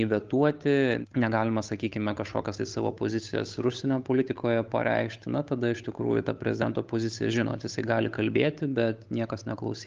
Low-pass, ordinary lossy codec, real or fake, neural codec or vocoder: 7.2 kHz; Opus, 32 kbps; fake; codec, 16 kHz, 8 kbps, FunCodec, trained on Chinese and English, 25 frames a second